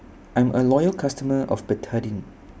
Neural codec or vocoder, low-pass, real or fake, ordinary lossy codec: none; none; real; none